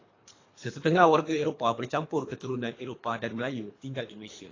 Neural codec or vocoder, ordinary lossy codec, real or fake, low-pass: codec, 24 kHz, 3 kbps, HILCodec; AAC, 32 kbps; fake; 7.2 kHz